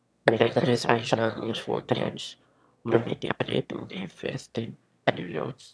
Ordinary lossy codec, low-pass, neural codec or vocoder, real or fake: none; none; autoencoder, 22.05 kHz, a latent of 192 numbers a frame, VITS, trained on one speaker; fake